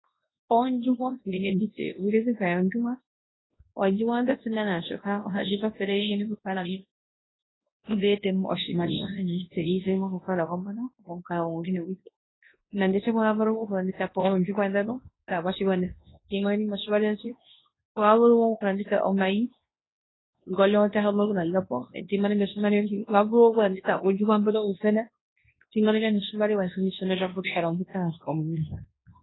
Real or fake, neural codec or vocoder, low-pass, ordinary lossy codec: fake; codec, 24 kHz, 0.9 kbps, WavTokenizer, large speech release; 7.2 kHz; AAC, 16 kbps